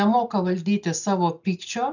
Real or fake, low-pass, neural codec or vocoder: real; 7.2 kHz; none